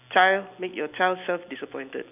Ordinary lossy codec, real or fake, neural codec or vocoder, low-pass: none; real; none; 3.6 kHz